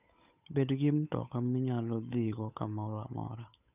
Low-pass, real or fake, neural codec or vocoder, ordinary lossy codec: 3.6 kHz; fake; codec, 16 kHz, 8 kbps, FunCodec, trained on Chinese and English, 25 frames a second; none